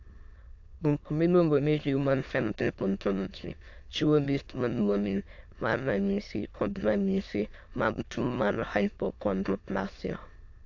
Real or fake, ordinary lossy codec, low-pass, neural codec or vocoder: fake; AAC, 48 kbps; 7.2 kHz; autoencoder, 22.05 kHz, a latent of 192 numbers a frame, VITS, trained on many speakers